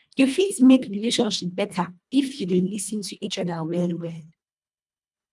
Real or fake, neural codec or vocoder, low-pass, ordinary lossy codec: fake; codec, 24 kHz, 1.5 kbps, HILCodec; none; none